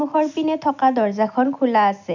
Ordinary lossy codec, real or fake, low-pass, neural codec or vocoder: none; real; 7.2 kHz; none